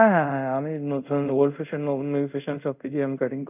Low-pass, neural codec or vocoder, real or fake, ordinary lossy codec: 3.6 kHz; codec, 24 kHz, 0.5 kbps, DualCodec; fake; none